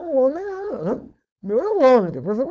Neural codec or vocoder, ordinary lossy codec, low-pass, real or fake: codec, 16 kHz, 4.8 kbps, FACodec; none; none; fake